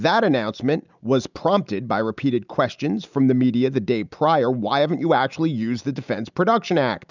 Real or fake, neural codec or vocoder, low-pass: real; none; 7.2 kHz